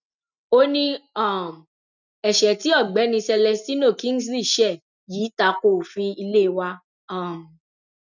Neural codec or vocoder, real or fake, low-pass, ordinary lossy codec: none; real; 7.2 kHz; none